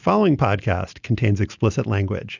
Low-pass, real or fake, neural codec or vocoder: 7.2 kHz; real; none